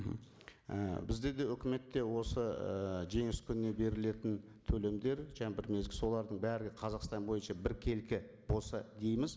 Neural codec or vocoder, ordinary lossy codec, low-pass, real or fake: none; none; none; real